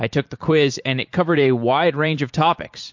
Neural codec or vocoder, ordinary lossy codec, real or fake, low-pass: vocoder, 44.1 kHz, 128 mel bands every 512 samples, BigVGAN v2; MP3, 48 kbps; fake; 7.2 kHz